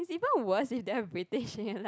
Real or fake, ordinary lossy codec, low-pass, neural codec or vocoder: real; none; none; none